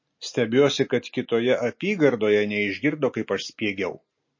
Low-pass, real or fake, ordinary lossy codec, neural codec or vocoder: 7.2 kHz; real; MP3, 32 kbps; none